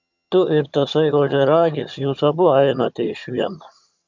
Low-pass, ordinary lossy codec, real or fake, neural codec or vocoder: 7.2 kHz; MP3, 64 kbps; fake; vocoder, 22.05 kHz, 80 mel bands, HiFi-GAN